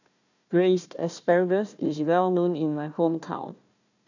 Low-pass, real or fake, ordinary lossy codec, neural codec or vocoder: 7.2 kHz; fake; none; codec, 16 kHz, 1 kbps, FunCodec, trained on Chinese and English, 50 frames a second